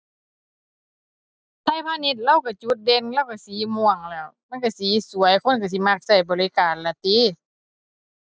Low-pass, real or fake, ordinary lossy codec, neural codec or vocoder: none; real; none; none